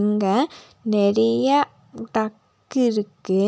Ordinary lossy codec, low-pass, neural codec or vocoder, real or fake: none; none; none; real